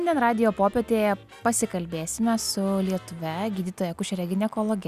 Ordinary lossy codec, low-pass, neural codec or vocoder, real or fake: Opus, 64 kbps; 14.4 kHz; none; real